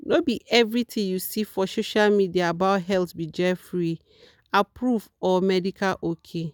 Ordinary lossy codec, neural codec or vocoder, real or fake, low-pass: none; none; real; none